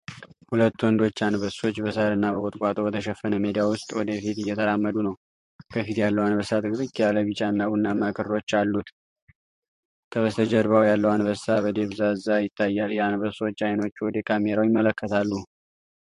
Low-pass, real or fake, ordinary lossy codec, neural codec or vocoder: 14.4 kHz; fake; MP3, 48 kbps; vocoder, 44.1 kHz, 128 mel bands, Pupu-Vocoder